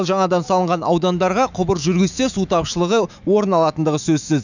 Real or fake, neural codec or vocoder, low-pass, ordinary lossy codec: fake; autoencoder, 48 kHz, 128 numbers a frame, DAC-VAE, trained on Japanese speech; 7.2 kHz; none